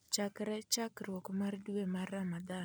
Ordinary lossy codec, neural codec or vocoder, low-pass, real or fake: none; none; none; real